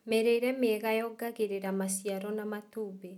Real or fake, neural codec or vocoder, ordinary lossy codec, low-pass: real; none; none; 19.8 kHz